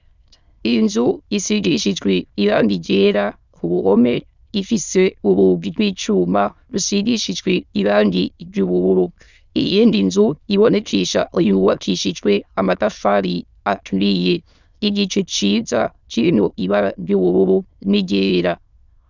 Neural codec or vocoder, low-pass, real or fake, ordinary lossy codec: autoencoder, 22.05 kHz, a latent of 192 numbers a frame, VITS, trained on many speakers; 7.2 kHz; fake; Opus, 64 kbps